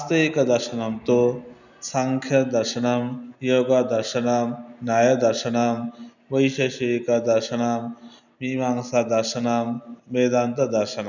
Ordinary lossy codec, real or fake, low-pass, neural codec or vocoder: none; real; 7.2 kHz; none